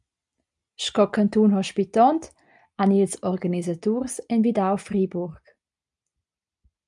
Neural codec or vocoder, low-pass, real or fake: none; 10.8 kHz; real